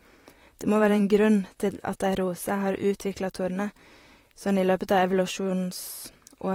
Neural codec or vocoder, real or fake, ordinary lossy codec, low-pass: vocoder, 44.1 kHz, 128 mel bands, Pupu-Vocoder; fake; AAC, 48 kbps; 19.8 kHz